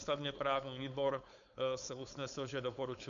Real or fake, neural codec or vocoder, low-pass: fake; codec, 16 kHz, 4.8 kbps, FACodec; 7.2 kHz